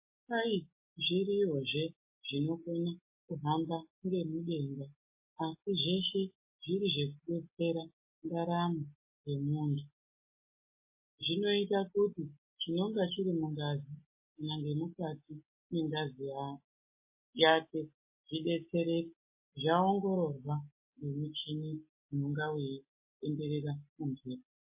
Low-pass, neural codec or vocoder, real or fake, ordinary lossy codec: 3.6 kHz; none; real; MP3, 24 kbps